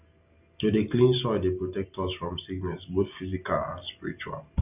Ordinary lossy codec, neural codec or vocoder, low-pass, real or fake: none; none; 3.6 kHz; real